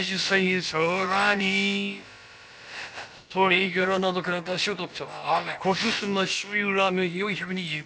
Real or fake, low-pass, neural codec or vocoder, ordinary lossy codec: fake; none; codec, 16 kHz, about 1 kbps, DyCAST, with the encoder's durations; none